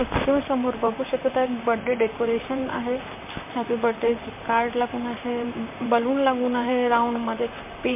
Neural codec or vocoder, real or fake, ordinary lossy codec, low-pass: vocoder, 44.1 kHz, 128 mel bands, Pupu-Vocoder; fake; MP3, 24 kbps; 3.6 kHz